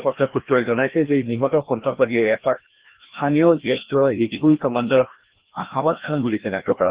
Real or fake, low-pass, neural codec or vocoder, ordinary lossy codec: fake; 3.6 kHz; codec, 16 kHz, 1 kbps, FreqCodec, larger model; Opus, 16 kbps